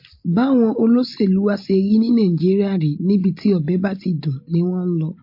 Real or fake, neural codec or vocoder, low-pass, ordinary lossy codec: real; none; 5.4 kHz; MP3, 32 kbps